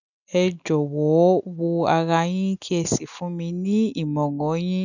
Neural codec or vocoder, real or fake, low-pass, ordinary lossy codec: none; real; 7.2 kHz; none